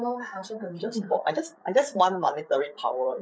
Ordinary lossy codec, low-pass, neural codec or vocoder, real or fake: none; none; codec, 16 kHz, 8 kbps, FreqCodec, larger model; fake